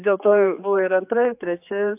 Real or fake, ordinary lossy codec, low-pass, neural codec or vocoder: fake; AAC, 32 kbps; 3.6 kHz; codec, 16 kHz, 4 kbps, X-Codec, HuBERT features, trained on general audio